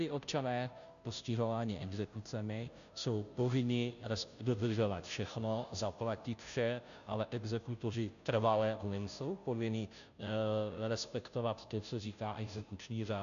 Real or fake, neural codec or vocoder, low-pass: fake; codec, 16 kHz, 0.5 kbps, FunCodec, trained on Chinese and English, 25 frames a second; 7.2 kHz